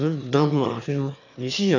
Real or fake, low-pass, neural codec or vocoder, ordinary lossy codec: fake; 7.2 kHz; autoencoder, 22.05 kHz, a latent of 192 numbers a frame, VITS, trained on one speaker; none